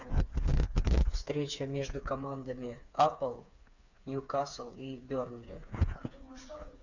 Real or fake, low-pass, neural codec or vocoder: fake; 7.2 kHz; codec, 16 kHz, 4 kbps, FreqCodec, smaller model